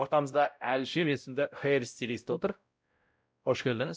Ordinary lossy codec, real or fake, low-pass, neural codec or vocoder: none; fake; none; codec, 16 kHz, 0.5 kbps, X-Codec, WavLM features, trained on Multilingual LibriSpeech